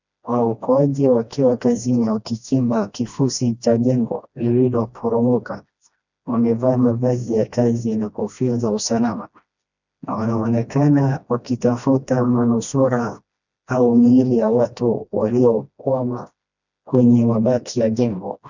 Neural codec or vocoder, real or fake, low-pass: codec, 16 kHz, 1 kbps, FreqCodec, smaller model; fake; 7.2 kHz